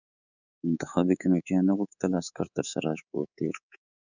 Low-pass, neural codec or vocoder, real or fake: 7.2 kHz; codec, 24 kHz, 3.1 kbps, DualCodec; fake